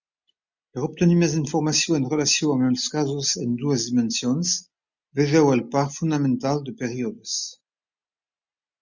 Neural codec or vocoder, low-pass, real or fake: none; 7.2 kHz; real